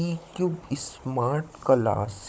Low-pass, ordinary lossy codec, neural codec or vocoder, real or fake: none; none; codec, 16 kHz, 16 kbps, FunCodec, trained on Chinese and English, 50 frames a second; fake